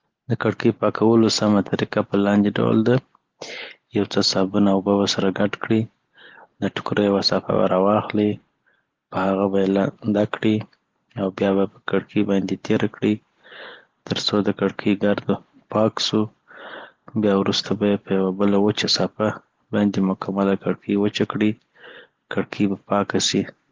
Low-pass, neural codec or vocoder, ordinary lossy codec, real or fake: 7.2 kHz; none; Opus, 16 kbps; real